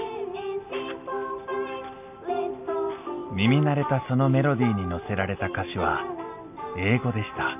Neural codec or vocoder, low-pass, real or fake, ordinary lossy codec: none; 3.6 kHz; real; none